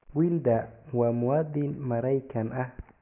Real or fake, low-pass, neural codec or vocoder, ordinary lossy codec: real; 3.6 kHz; none; none